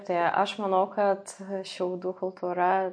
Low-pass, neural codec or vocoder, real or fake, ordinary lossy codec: 9.9 kHz; none; real; MP3, 48 kbps